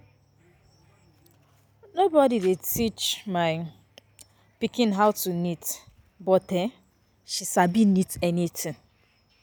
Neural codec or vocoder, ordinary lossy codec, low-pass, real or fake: none; none; none; real